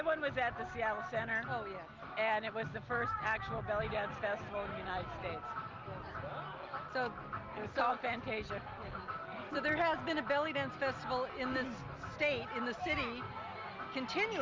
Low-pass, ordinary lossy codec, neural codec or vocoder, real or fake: 7.2 kHz; Opus, 32 kbps; none; real